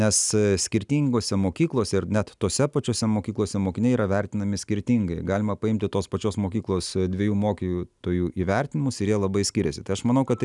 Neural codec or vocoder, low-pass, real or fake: none; 10.8 kHz; real